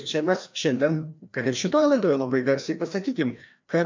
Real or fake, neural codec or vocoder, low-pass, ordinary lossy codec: fake; codec, 16 kHz, 1 kbps, FreqCodec, larger model; 7.2 kHz; MP3, 64 kbps